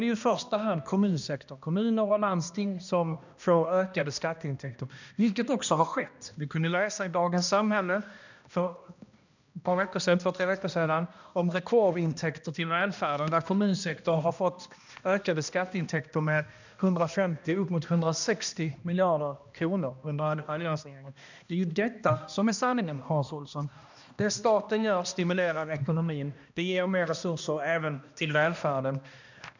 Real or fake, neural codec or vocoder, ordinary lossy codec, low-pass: fake; codec, 16 kHz, 1 kbps, X-Codec, HuBERT features, trained on balanced general audio; none; 7.2 kHz